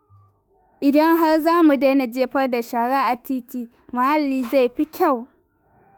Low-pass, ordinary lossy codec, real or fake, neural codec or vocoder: none; none; fake; autoencoder, 48 kHz, 32 numbers a frame, DAC-VAE, trained on Japanese speech